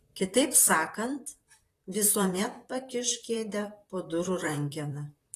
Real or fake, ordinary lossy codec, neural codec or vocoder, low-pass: fake; AAC, 48 kbps; vocoder, 44.1 kHz, 128 mel bands, Pupu-Vocoder; 14.4 kHz